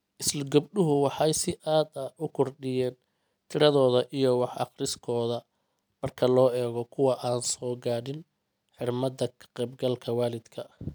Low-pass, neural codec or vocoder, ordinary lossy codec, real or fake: none; none; none; real